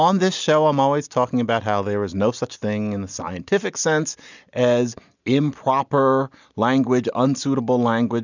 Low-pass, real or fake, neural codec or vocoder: 7.2 kHz; real; none